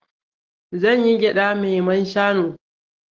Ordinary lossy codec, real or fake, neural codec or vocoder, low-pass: Opus, 16 kbps; real; none; 7.2 kHz